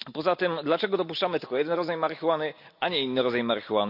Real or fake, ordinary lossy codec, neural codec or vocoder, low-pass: real; none; none; 5.4 kHz